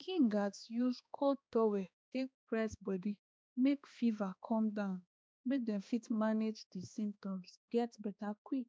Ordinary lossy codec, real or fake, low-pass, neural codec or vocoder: none; fake; none; codec, 16 kHz, 2 kbps, X-Codec, HuBERT features, trained on balanced general audio